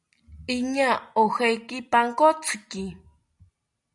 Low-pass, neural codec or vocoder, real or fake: 10.8 kHz; none; real